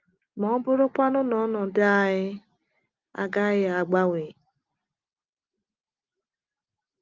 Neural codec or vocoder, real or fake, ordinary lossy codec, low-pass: none; real; Opus, 32 kbps; 7.2 kHz